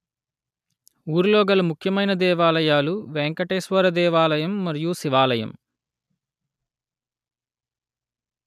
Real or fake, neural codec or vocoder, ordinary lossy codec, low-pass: real; none; none; 14.4 kHz